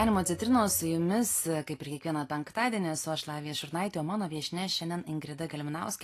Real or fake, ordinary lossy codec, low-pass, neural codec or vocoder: real; AAC, 48 kbps; 14.4 kHz; none